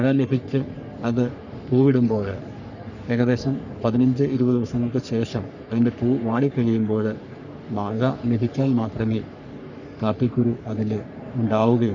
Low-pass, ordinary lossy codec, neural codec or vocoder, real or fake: 7.2 kHz; none; codec, 44.1 kHz, 3.4 kbps, Pupu-Codec; fake